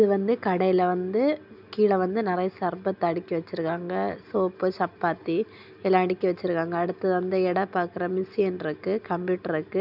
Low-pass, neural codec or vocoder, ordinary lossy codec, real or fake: 5.4 kHz; none; none; real